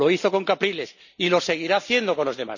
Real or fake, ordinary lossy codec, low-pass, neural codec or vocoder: real; none; 7.2 kHz; none